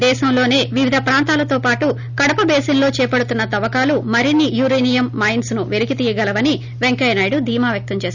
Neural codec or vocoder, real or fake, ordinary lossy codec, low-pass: none; real; none; 7.2 kHz